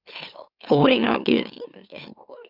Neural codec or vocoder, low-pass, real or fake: autoencoder, 44.1 kHz, a latent of 192 numbers a frame, MeloTTS; 5.4 kHz; fake